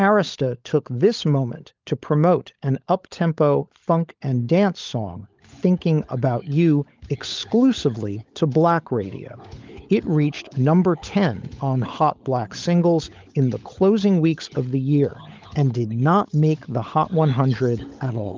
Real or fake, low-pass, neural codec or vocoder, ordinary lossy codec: fake; 7.2 kHz; codec, 16 kHz, 16 kbps, FunCodec, trained on LibriTTS, 50 frames a second; Opus, 32 kbps